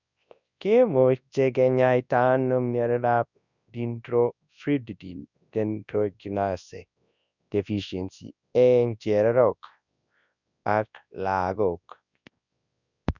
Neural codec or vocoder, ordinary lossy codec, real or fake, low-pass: codec, 24 kHz, 0.9 kbps, WavTokenizer, large speech release; none; fake; 7.2 kHz